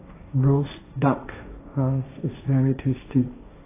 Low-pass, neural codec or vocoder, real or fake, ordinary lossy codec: 3.6 kHz; codec, 16 kHz, 1.1 kbps, Voila-Tokenizer; fake; AAC, 16 kbps